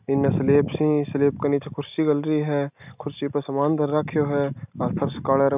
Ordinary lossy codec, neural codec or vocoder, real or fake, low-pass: none; none; real; 3.6 kHz